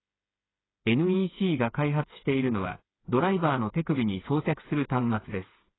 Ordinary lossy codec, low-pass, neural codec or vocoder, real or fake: AAC, 16 kbps; 7.2 kHz; codec, 16 kHz, 8 kbps, FreqCodec, smaller model; fake